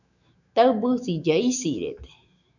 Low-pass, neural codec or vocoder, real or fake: 7.2 kHz; autoencoder, 48 kHz, 128 numbers a frame, DAC-VAE, trained on Japanese speech; fake